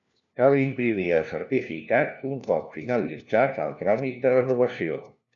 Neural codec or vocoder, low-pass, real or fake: codec, 16 kHz, 1 kbps, FunCodec, trained on LibriTTS, 50 frames a second; 7.2 kHz; fake